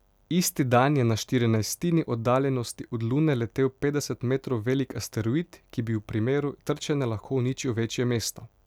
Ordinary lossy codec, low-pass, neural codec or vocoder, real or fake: none; 19.8 kHz; none; real